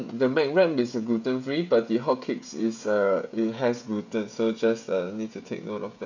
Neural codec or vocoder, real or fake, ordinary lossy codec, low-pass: codec, 16 kHz, 16 kbps, FreqCodec, smaller model; fake; none; 7.2 kHz